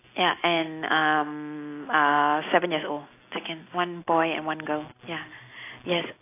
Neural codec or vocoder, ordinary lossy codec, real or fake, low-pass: none; AAC, 24 kbps; real; 3.6 kHz